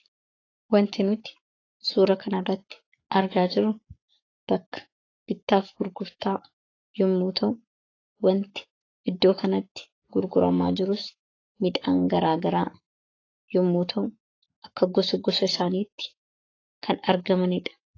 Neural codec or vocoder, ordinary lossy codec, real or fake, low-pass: codec, 44.1 kHz, 7.8 kbps, Pupu-Codec; AAC, 32 kbps; fake; 7.2 kHz